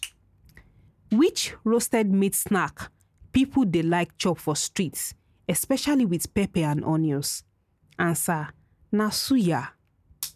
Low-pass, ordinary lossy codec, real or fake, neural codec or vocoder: 14.4 kHz; none; real; none